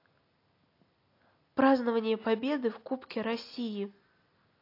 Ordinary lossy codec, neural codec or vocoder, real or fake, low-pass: AAC, 32 kbps; none; real; 5.4 kHz